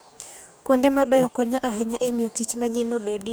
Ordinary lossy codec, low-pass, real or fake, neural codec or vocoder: none; none; fake; codec, 44.1 kHz, 2.6 kbps, DAC